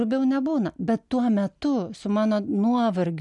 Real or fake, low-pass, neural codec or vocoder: real; 10.8 kHz; none